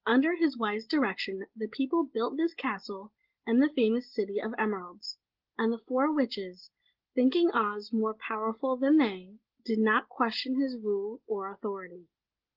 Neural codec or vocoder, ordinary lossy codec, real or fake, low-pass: none; Opus, 16 kbps; real; 5.4 kHz